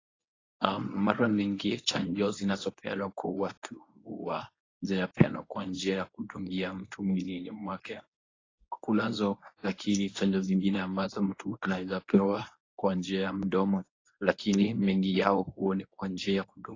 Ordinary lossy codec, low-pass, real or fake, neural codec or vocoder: AAC, 32 kbps; 7.2 kHz; fake; codec, 24 kHz, 0.9 kbps, WavTokenizer, medium speech release version 1